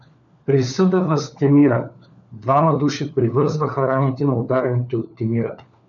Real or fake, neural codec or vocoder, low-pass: fake; codec, 16 kHz, 4 kbps, FunCodec, trained on LibriTTS, 50 frames a second; 7.2 kHz